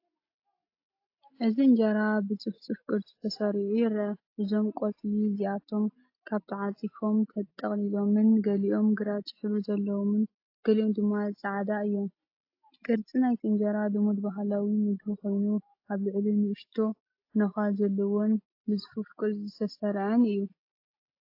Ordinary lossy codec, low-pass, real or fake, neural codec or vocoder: AAC, 32 kbps; 5.4 kHz; real; none